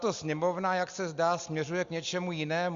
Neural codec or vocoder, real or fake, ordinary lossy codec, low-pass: none; real; Opus, 64 kbps; 7.2 kHz